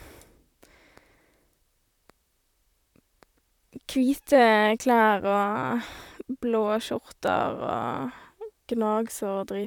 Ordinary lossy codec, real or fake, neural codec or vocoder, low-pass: none; fake; vocoder, 44.1 kHz, 128 mel bands, Pupu-Vocoder; 19.8 kHz